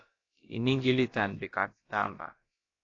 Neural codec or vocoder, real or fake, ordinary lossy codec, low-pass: codec, 16 kHz, about 1 kbps, DyCAST, with the encoder's durations; fake; AAC, 32 kbps; 7.2 kHz